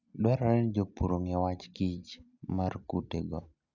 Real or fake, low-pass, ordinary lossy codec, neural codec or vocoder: real; 7.2 kHz; none; none